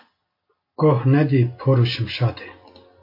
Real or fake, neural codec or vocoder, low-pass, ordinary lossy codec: real; none; 5.4 kHz; MP3, 32 kbps